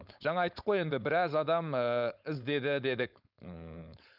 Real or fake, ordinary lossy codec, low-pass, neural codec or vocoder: fake; none; 5.4 kHz; codec, 16 kHz, 4.8 kbps, FACodec